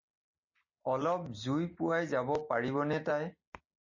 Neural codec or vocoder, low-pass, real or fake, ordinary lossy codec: none; 7.2 kHz; real; MP3, 32 kbps